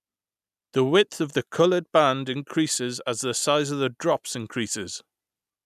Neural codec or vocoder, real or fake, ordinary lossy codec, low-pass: none; real; none; 14.4 kHz